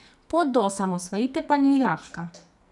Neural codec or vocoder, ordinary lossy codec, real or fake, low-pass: codec, 44.1 kHz, 2.6 kbps, SNAC; none; fake; 10.8 kHz